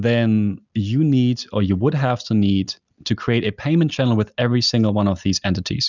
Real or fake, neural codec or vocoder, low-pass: real; none; 7.2 kHz